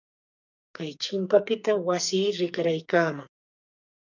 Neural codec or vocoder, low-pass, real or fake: codec, 44.1 kHz, 2.6 kbps, SNAC; 7.2 kHz; fake